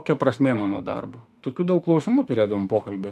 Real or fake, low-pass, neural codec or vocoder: fake; 14.4 kHz; autoencoder, 48 kHz, 32 numbers a frame, DAC-VAE, trained on Japanese speech